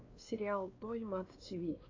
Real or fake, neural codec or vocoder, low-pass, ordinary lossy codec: fake; codec, 16 kHz, 2 kbps, X-Codec, WavLM features, trained on Multilingual LibriSpeech; 7.2 kHz; AAC, 32 kbps